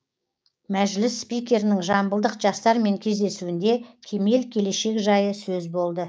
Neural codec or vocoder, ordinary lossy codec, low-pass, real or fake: codec, 16 kHz, 6 kbps, DAC; none; none; fake